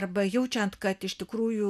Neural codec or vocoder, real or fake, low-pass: none; real; 14.4 kHz